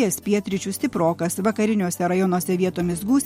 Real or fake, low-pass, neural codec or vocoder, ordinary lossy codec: real; 19.8 kHz; none; MP3, 64 kbps